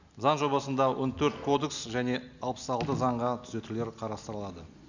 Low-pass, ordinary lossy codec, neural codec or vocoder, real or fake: 7.2 kHz; none; none; real